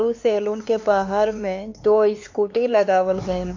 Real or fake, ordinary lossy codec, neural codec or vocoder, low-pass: fake; AAC, 48 kbps; codec, 16 kHz, 4 kbps, X-Codec, HuBERT features, trained on LibriSpeech; 7.2 kHz